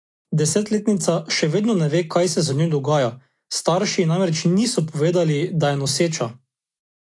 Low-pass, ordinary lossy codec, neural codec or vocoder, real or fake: 10.8 kHz; AAC, 48 kbps; none; real